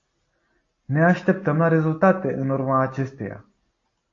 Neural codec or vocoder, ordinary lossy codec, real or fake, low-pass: none; AAC, 48 kbps; real; 7.2 kHz